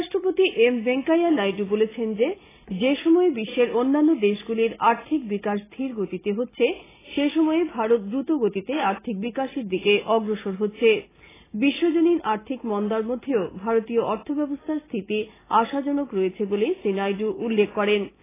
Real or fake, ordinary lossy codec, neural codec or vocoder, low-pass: real; AAC, 16 kbps; none; 3.6 kHz